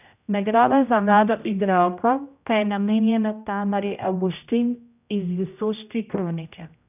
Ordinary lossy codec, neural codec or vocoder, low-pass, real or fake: none; codec, 16 kHz, 0.5 kbps, X-Codec, HuBERT features, trained on general audio; 3.6 kHz; fake